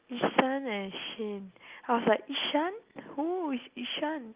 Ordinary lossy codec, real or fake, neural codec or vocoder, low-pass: Opus, 24 kbps; real; none; 3.6 kHz